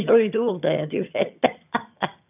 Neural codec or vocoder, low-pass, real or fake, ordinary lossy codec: vocoder, 22.05 kHz, 80 mel bands, HiFi-GAN; 3.6 kHz; fake; none